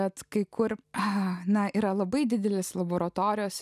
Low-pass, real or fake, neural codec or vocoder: 14.4 kHz; real; none